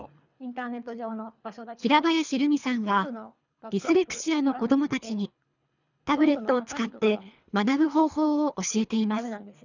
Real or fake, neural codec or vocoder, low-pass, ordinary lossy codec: fake; codec, 24 kHz, 3 kbps, HILCodec; 7.2 kHz; none